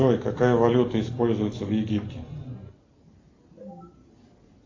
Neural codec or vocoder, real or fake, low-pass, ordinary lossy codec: none; real; 7.2 kHz; AAC, 32 kbps